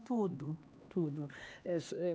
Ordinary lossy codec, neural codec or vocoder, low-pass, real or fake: none; codec, 16 kHz, 2 kbps, X-Codec, HuBERT features, trained on LibriSpeech; none; fake